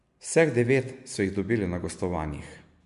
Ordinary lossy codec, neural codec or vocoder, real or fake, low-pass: MP3, 64 kbps; none; real; 10.8 kHz